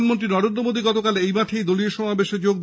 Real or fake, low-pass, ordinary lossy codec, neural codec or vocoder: real; none; none; none